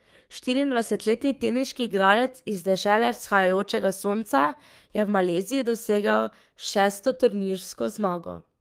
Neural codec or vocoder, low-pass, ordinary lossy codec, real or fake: codec, 32 kHz, 1.9 kbps, SNAC; 14.4 kHz; Opus, 32 kbps; fake